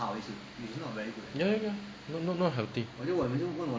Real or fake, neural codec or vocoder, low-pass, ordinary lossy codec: real; none; none; none